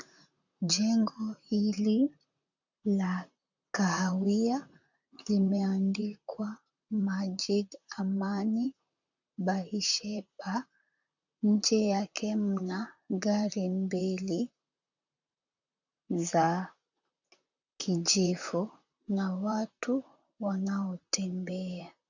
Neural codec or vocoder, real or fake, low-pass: vocoder, 44.1 kHz, 128 mel bands, Pupu-Vocoder; fake; 7.2 kHz